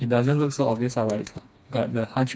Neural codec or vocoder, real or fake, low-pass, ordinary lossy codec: codec, 16 kHz, 2 kbps, FreqCodec, smaller model; fake; none; none